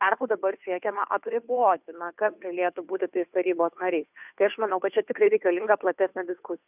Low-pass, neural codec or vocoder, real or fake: 3.6 kHz; codec, 16 kHz, 2 kbps, FunCodec, trained on Chinese and English, 25 frames a second; fake